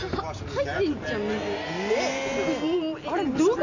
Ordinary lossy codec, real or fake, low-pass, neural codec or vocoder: none; real; 7.2 kHz; none